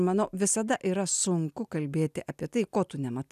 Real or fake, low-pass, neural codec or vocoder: real; 14.4 kHz; none